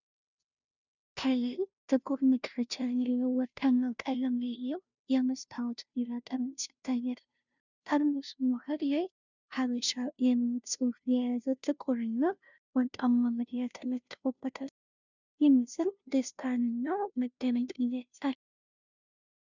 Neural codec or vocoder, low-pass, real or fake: codec, 16 kHz, 0.5 kbps, FunCodec, trained on Chinese and English, 25 frames a second; 7.2 kHz; fake